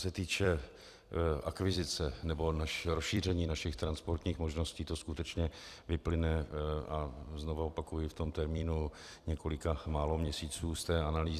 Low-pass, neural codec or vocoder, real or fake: 14.4 kHz; vocoder, 44.1 kHz, 128 mel bands every 256 samples, BigVGAN v2; fake